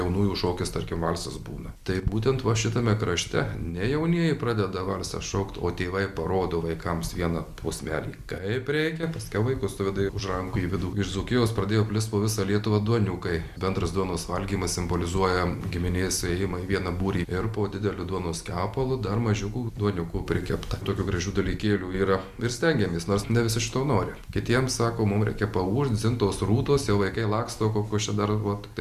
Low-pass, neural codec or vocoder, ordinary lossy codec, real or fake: 14.4 kHz; none; Opus, 64 kbps; real